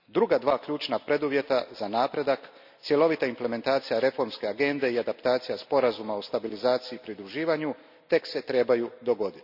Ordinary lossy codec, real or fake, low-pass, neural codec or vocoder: none; real; 5.4 kHz; none